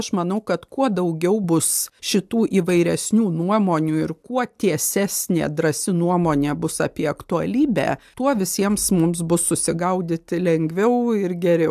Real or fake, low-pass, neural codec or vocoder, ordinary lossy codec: real; 14.4 kHz; none; AAC, 96 kbps